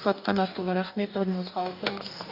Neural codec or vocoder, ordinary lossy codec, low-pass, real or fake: codec, 44.1 kHz, 2.6 kbps, DAC; none; 5.4 kHz; fake